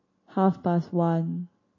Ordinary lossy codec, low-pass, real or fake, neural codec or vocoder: MP3, 32 kbps; 7.2 kHz; real; none